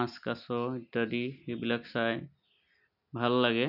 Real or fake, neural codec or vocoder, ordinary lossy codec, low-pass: real; none; none; 5.4 kHz